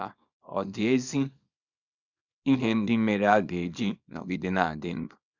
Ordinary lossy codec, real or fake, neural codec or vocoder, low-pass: none; fake; codec, 24 kHz, 0.9 kbps, WavTokenizer, small release; 7.2 kHz